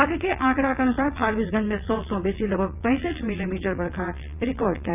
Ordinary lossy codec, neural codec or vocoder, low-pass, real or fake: none; vocoder, 22.05 kHz, 80 mel bands, WaveNeXt; 3.6 kHz; fake